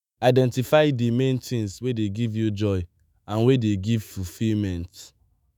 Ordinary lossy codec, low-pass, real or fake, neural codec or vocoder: none; none; fake; autoencoder, 48 kHz, 128 numbers a frame, DAC-VAE, trained on Japanese speech